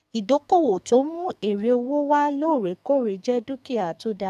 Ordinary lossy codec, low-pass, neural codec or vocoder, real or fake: AAC, 96 kbps; 14.4 kHz; codec, 44.1 kHz, 2.6 kbps, SNAC; fake